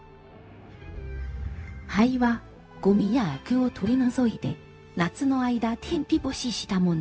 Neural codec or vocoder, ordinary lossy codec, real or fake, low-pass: codec, 16 kHz, 0.4 kbps, LongCat-Audio-Codec; none; fake; none